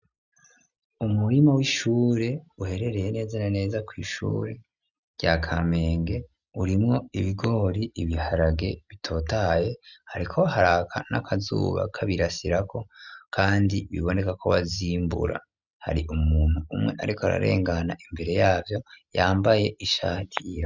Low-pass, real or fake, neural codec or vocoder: 7.2 kHz; real; none